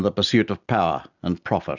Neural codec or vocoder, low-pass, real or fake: none; 7.2 kHz; real